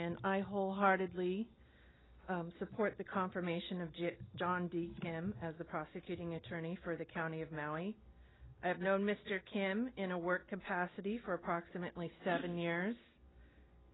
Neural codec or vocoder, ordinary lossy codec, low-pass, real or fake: none; AAC, 16 kbps; 7.2 kHz; real